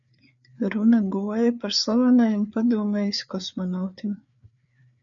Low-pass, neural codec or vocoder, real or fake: 7.2 kHz; codec, 16 kHz, 4 kbps, FreqCodec, larger model; fake